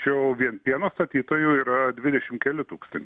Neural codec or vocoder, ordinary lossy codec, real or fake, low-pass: none; MP3, 96 kbps; real; 9.9 kHz